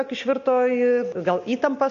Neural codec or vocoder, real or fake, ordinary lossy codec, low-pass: none; real; AAC, 48 kbps; 7.2 kHz